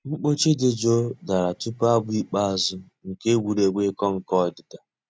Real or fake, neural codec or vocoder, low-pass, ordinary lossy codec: real; none; none; none